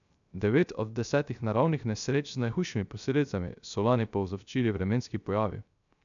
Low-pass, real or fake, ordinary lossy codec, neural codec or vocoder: 7.2 kHz; fake; none; codec, 16 kHz, 0.3 kbps, FocalCodec